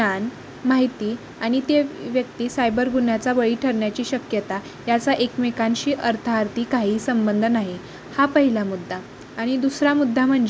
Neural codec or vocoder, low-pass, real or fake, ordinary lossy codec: none; none; real; none